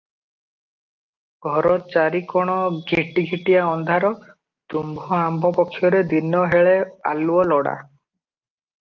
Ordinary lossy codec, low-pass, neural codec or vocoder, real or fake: Opus, 24 kbps; 7.2 kHz; none; real